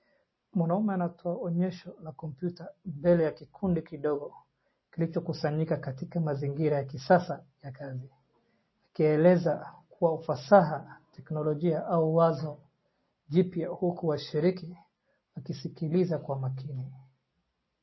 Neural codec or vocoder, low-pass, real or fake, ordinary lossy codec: none; 7.2 kHz; real; MP3, 24 kbps